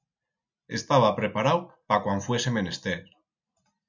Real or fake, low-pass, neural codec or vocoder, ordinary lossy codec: real; 7.2 kHz; none; MP3, 64 kbps